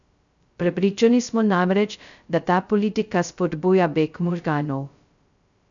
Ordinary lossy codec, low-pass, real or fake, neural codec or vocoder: none; 7.2 kHz; fake; codec, 16 kHz, 0.2 kbps, FocalCodec